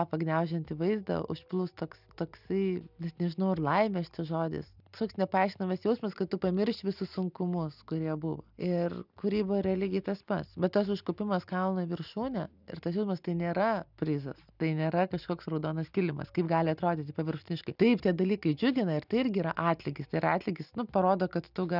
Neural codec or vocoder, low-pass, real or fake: none; 5.4 kHz; real